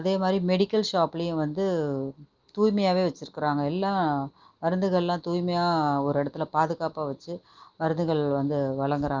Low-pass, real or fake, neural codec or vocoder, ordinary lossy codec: 7.2 kHz; real; none; Opus, 32 kbps